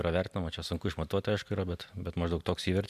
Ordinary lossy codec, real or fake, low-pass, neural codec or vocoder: MP3, 96 kbps; real; 14.4 kHz; none